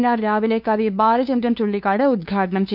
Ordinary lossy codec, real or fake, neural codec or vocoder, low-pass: none; fake; codec, 16 kHz, 2 kbps, FunCodec, trained on LibriTTS, 25 frames a second; 5.4 kHz